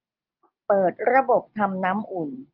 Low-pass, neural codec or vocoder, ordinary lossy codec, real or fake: 5.4 kHz; none; Opus, 24 kbps; real